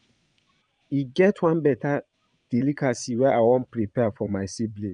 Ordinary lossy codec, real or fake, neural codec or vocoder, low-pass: none; fake; vocoder, 22.05 kHz, 80 mel bands, Vocos; 9.9 kHz